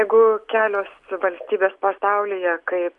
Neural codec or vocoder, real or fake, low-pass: none; real; 10.8 kHz